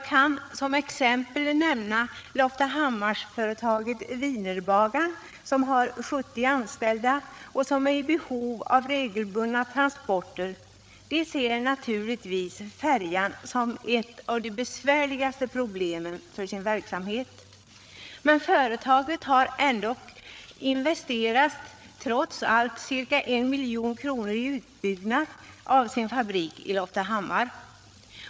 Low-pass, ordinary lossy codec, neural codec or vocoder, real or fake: none; none; codec, 16 kHz, 8 kbps, FreqCodec, larger model; fake